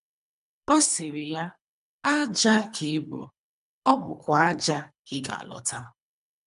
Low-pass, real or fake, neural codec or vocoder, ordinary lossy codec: 10.8 kHz; fake; codec, 24 kHz, 3 kbps, HILCodec; none